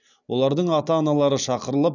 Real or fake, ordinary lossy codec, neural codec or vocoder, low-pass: real; none; none; 7.2 kHz